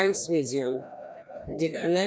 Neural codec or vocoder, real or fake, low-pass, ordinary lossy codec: codec, 16 kHz, 1 kbps, FreqCodec, larger model; fake; none; none